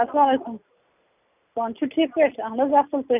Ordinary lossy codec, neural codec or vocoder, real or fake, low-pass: none; none; real; 3.6 kHz